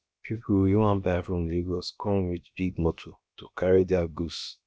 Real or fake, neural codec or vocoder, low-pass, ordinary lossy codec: fake; codec, 16 kHz, about 1 kbps, DyCAST, with the encoder's durations; none; none